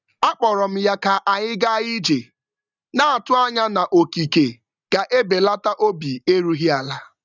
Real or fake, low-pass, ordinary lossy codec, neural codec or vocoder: real; 7.2 kHz; none; none